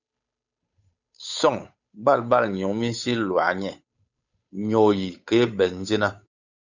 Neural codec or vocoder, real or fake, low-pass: codec, 16 kHz, 8 kbps, FunCodec, trained on Chinese and English, 25 frames a second; fake; 7.2 kHz